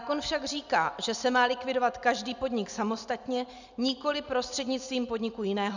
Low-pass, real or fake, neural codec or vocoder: 7.2 kHz; real; none